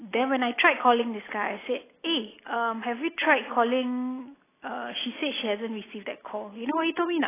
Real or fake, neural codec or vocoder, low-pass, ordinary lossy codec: real; none; 3.6 kHz; AAC, 16 kbps